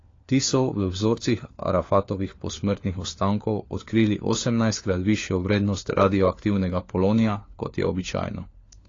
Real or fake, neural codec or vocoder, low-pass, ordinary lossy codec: fake; codec, 16 kHz, 4 kbps, FunCodec, trained on LibriTTS, 50 frames a second; 7.2 kHz; AAC, 32 kbps